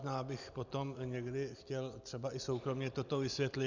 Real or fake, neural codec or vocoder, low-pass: real; none; 7.2 kHz